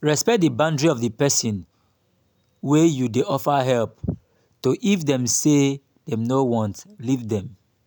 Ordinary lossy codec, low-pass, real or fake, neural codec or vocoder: none; none; real; none